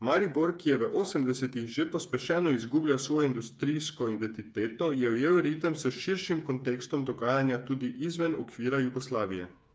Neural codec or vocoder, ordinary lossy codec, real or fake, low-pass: codec, 16 kHz, 4 kbps, FreqCodec, smaller model; none; fake; none